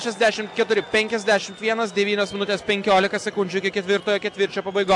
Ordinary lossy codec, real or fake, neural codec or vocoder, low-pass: AAC, 64 kbps; real; none; 10.8 kHz